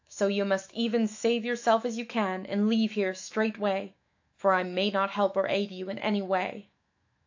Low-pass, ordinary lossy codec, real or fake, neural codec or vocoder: 7.2 kHz; AAC, 48 kbps; fake; autoencoder, 48 kHz, 128 numbers a frame, DAC-VAE, trained on Japanese speech